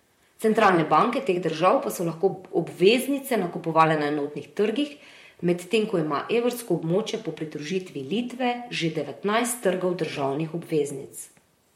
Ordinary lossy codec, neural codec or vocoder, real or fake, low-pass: MP3, 64 kbps; vocoder, 44.1 kHz, 128 mel bands, Pupu-Vocoder; fake; 19.8 kHz